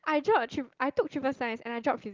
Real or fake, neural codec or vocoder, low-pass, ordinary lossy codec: real; none; 7.2 kHz; Opus, 32 kbps